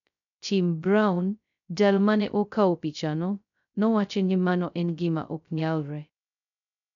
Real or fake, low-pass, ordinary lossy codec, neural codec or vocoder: fake; 7.2 kHz; none; codec, 16 kHz, 0.2 kbps, FocalCodec